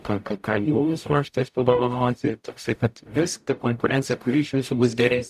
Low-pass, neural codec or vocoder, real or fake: 14.4 kHz; codec, 44.1 kHz, 0.9 kbps, DAC; fake